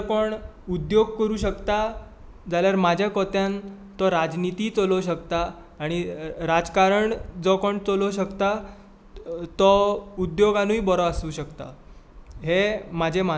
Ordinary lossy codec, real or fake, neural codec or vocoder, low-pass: none; real; none; none